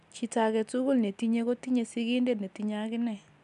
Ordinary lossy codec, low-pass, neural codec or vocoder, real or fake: none; 10.8 kHz; none; real